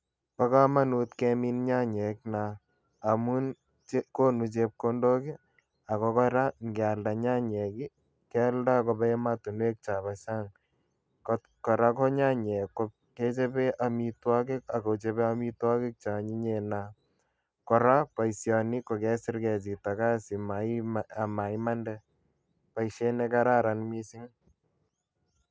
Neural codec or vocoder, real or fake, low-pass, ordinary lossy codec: none; real; none; none